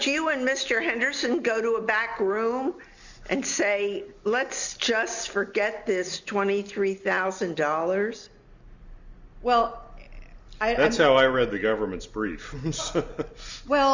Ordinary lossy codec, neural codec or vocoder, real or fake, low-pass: Opus, 64 kbps; none; real; 7.2 kHz